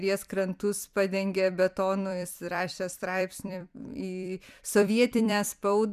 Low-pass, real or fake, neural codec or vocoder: 14.4 kHz; fake; vocoder, 44.1 kHz, 128 mel bands every 256 samples, BigVGAN v2